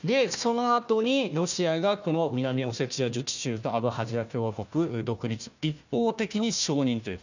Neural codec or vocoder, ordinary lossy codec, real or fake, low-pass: codec, 16 kHz, 1 kbps, FunCodec, trained on Chinese and English, 50 frames a second; none; fake; 7.2 kHz